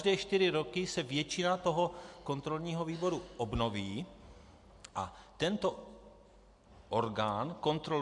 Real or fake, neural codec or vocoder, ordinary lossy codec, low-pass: real; none; MP3, 64 kbps; 10.8 kHz